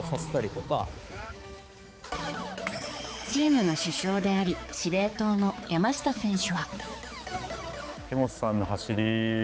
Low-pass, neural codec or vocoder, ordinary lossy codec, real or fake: none; codec, 16 kHz, 4 kbps, X-Codec, HuBERT features, trained on balanced general audio; none; fake